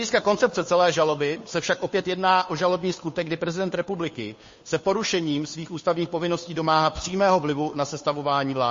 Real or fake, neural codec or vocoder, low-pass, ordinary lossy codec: fake; codec, 16 kHz, 2 kbps, FunCodec, trained on Chinese and English, 25 frames a second; 7.2 kHz; MP3, 32 kbps